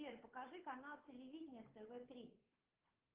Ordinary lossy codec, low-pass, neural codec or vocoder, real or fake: Opus, 16 kbps; 3.6 kHz; codec, 16 kHz, 2 kbps, FunCodec, trained on Chinese and English, 25 frames a second; fake